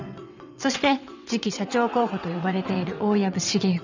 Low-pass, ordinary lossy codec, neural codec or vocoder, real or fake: 7.2 kHz; none; vocoder, 22.05 kHz, 80 mel bands, WaveNeXt; fake